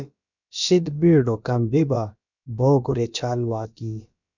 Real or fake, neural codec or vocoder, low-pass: fake; codec, 16 kHz, about 1 kbps, DyCAST, with the encoder's durations; 7.2 kHz